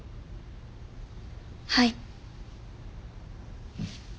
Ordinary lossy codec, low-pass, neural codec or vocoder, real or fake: none; none; none; real